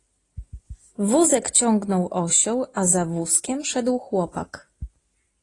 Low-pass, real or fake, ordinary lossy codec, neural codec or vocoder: 10.8 kHz; real; AAC, 32 kbps; none